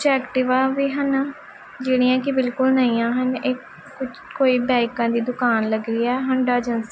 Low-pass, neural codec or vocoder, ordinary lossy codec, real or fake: none; none; none; real